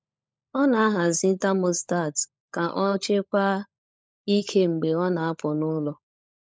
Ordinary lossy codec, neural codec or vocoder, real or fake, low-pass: none; codec, 16 kHz, 16 kbps, FunCodec, trained on LibriTTS, 50 frames a second; fake; none